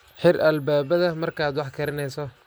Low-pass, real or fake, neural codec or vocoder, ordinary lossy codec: none; real; none; none